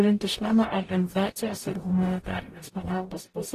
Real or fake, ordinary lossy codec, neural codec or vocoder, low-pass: fake; AAC, 48 kbps; codec, 44.1 kHz, 0.9 kbps, DAC; 14.4 kHz